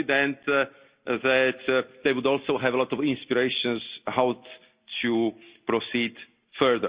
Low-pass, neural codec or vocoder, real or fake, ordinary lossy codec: 3.6 kHz; none; real; Opus, 64 kbps